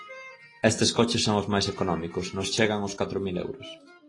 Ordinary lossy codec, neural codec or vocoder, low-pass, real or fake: AAC, 32 kbps; none; 10.8 kHz; real